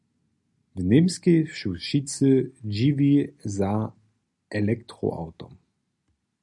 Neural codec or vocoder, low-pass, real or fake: none; 10.8 kHz; real